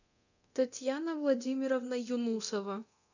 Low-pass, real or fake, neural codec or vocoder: 7.2 kHz; fake; codec, 24 kHz, 0.9 kbps, DualCodec